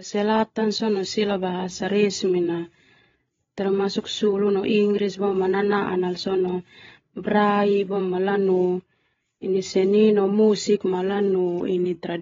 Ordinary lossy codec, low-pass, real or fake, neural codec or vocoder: AAC, 24 kbps; 7.2 kHz; fake; codec, 16 kHz, 8 kbps, FreqCodec, larger model